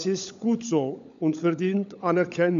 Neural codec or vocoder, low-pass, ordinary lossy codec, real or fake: codec, 16 kHz, 16 kbps, FunCodec, trained on LibriTTS, 50 frames a second; 7.2 kHz; MP3, 64 kbps; fake